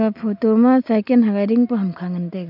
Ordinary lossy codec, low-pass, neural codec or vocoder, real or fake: none; 5.4 kHz; none; real